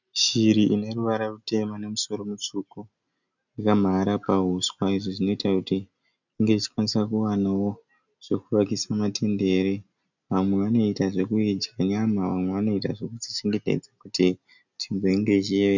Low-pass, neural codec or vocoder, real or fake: 7.2 kHz; none; real